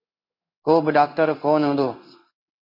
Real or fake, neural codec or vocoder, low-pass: fake; codec, 16 kHz in and 24 kHz out, 1 kbps, XY-Tokenizer; 5.4 kHz